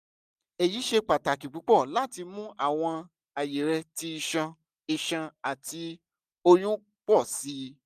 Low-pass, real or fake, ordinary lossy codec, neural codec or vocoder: 14.4 kHz; real; none; none